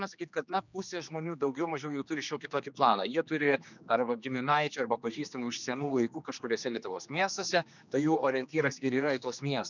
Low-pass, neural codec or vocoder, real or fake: 7.2 kHz; codec, 16 kHz, 2 kbps, X-Codec, HuBERT features, trained on general audio; fake